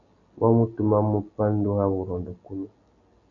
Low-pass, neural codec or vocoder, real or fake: 7.2 kHz; none; real